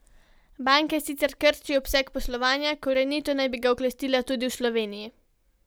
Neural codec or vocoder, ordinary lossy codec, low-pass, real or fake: none; none; none; real